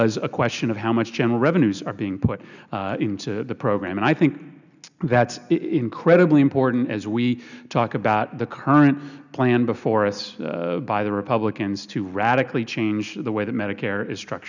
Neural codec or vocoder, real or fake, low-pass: none; real; 7.2 kHz